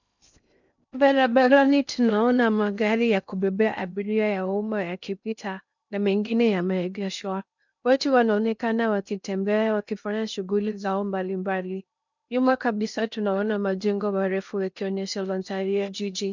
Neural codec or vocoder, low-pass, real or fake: codec, 16 kHz in and 24 kHz out, 0.6 kbps, FocalCodec, streaming, 2048 codes; 7.2 kHz; fake